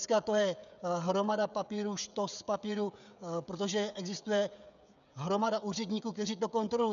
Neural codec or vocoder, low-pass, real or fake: codec, 16 kHz, 16 kbps, FreqCodec, smaller model; 7.2 kHz; fake